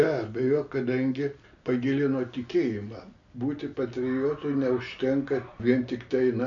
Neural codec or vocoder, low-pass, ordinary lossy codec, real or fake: none; 7.2 kHz; MP3, 48 kbps; real